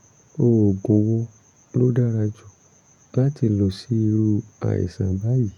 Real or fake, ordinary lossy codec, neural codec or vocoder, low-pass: fake; none; vocoder, 48 kHz, 128 mel bands, Vocos; 19.8 kHz